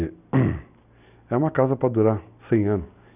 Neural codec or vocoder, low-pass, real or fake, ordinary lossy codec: none; 3.6 kHz; real; none